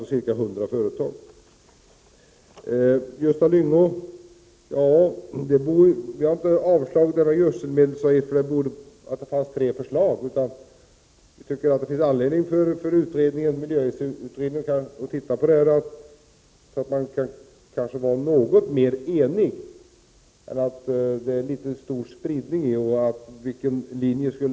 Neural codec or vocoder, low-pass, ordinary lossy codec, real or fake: none; none; none; real